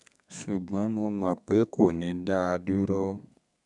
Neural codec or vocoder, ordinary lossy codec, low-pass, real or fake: codec, 32 kHz, 1.9 kbps, SNAC; none; 10.8 kHz; fake